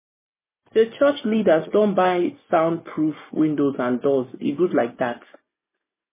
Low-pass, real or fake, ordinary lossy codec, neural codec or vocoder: 3.6 kHz; real; MP3, 16 kbps; none